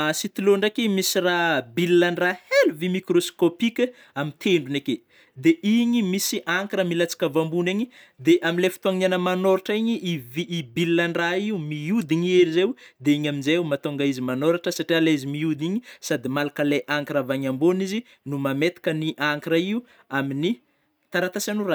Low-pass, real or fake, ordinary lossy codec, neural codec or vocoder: none; real; none; none